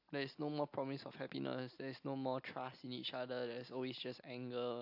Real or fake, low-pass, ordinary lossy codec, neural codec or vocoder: real; 5.4 kHz; AAC, 48 kbps; none